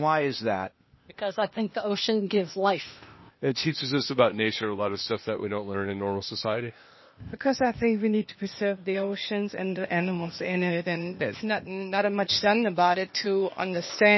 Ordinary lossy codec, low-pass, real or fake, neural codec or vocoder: MP3, 24 kbps; 7.2 kHz; fake; codec, 16 kHz, 0.8 kbps, ZipCodec